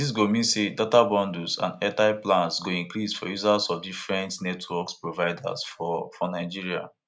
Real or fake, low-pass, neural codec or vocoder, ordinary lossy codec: real; none; none; none